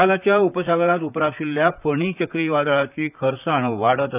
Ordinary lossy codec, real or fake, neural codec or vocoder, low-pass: none; fake; vocoder, 44.1 kHz, 128 mel bands, Pupu-Vocoder; 3.6 kHz